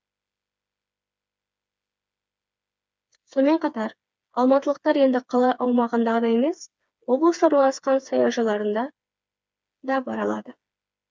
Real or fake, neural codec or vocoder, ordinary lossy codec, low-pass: fake; codec, 16 kHz, 4 kbps, FreqCodec, smaller model; none; none